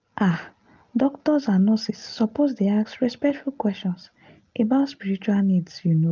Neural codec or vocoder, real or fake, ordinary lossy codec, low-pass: none; real; Opus, 32 kbps; 7.2 kHz